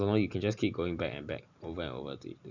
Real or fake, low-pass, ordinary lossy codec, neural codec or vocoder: real; 7.2 kHz; none; none